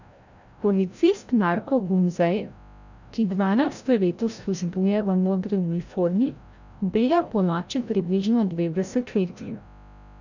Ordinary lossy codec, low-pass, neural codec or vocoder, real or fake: none; 7.2 kHz; codec, 16 kHz, 0.5 kbps, FreqCodec, larger model; fake